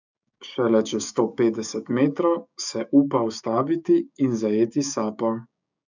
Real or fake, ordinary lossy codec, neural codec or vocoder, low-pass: fake; none; codec, 16 kHz, 6 kbps, DAC; 7.2 kHz